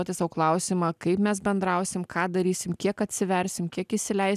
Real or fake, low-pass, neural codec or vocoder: real; 14.4 kHz; none